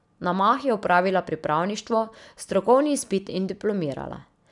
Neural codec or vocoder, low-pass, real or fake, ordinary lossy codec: none; 10.8 kHz; real; none